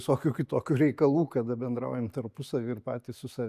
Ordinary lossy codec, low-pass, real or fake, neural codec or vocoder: AAC, 96 kbps; 14.4 kHz; real; none